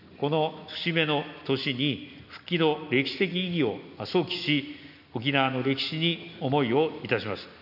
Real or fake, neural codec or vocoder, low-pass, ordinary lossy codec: real; none; 5.4 kHz; none